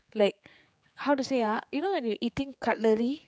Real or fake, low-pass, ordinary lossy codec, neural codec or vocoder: fake; none; none; codec, 16 kHz, 4 kbps, X-Codec, HuBERT features, trained on general audio